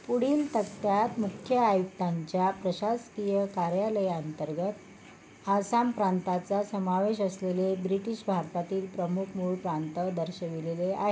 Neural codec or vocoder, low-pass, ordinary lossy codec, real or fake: none; none; none; real